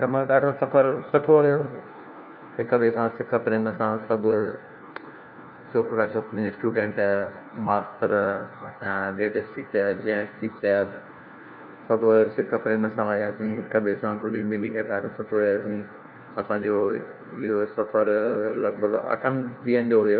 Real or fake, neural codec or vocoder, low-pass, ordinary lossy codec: fake; codec, 16 kHz, 1 kbps, FunCodec, trained on LibriTTS, 50 frames a second; 5.4 kHz; none